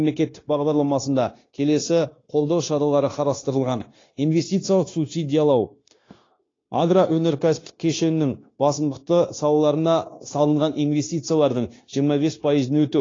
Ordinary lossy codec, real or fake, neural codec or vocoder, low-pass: AAC, 32 kbps; fake; codec, 16 kHz, 0.9 kbps, LongCat-Audio-Codec; 7.2 kHz